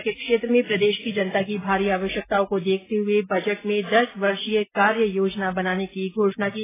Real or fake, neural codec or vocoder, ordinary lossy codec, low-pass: real; none; AAC, 16 kbps; 3.6 kHz